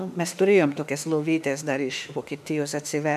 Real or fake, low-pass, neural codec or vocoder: fake; 14.4 kHz; autoencoder, 48 kHz, 32 numbers a frame, DAC-VAE, trained on Japanese speech